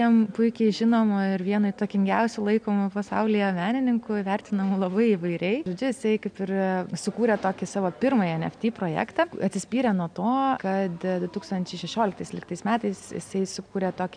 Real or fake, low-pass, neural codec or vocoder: real; 9.9 kHz; none